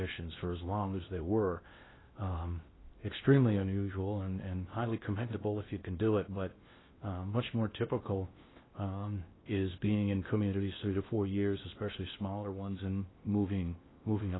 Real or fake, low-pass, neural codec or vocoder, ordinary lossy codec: fake; 7.2 kHz; codec, 16 kHz in and 24 kHz out, 0.6 kbps, FocalCodec, streaming, 2048 codes; AAC, 16 kbps